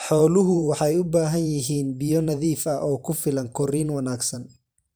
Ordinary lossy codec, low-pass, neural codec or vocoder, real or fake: none; none; vocoder, 44.1 kHz, 128 mel bands every 512 samples, BigVGAN v2; fake